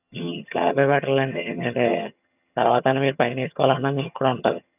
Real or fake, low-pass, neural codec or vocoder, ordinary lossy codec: fake; 3.6 kHz; vocoder, 22.05 kHz, 80 mel bands, HiFi-GAN; none